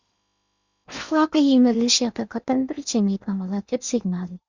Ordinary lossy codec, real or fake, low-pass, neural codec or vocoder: Opus, 64 kbps; fake; 7.2 kHz; codec, 16 kHz in and 24 kHz out, 0.8 kbps, FocalCodec, streaming, 65536 codes